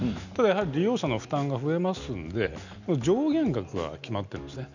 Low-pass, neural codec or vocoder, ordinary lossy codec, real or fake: 7.2 kHz; none; none; real